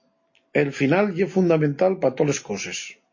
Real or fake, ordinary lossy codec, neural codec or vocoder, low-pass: real; MP3, 32 kbps; none; 7.2 kHz